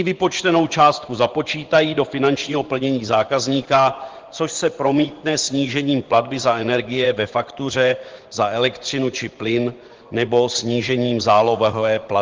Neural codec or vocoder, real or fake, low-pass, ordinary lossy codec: vocoder, 24 kHz, 100 mel bands, Vocos; fake; 7.2 kHz; Opus, 16 kbps